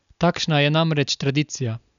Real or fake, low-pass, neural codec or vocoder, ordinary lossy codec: real; 7.2 kHz; none; none